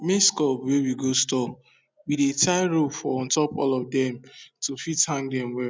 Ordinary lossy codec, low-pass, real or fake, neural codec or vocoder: none; none; real; none